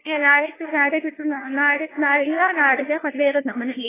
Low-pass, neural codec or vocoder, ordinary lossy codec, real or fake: 3.6 kHz; codec, 16 kHz, 1 kbps, FunCodec, trained on LibriTTS, 50 frames a second; AAC, 16 kbps; fake